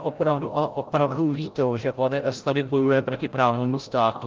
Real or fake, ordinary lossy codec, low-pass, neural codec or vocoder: fake; Opus, 32 kbps; 7.2 kHz; codec, 16 kHz, 0.5 kbps, FreqCodec, larger model